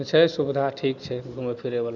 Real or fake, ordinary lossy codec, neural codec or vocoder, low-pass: real; none; none; 7.2 kHz